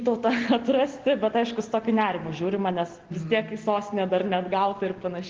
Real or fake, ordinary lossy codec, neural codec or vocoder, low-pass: real; Opus, 16 kbps; none; 7.2 kHz